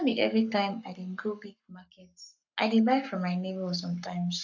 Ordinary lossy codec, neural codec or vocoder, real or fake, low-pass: none; codec, 44.1 kHz, 7.8 kbps, Pupu-Codec; fake; 7.2 kHz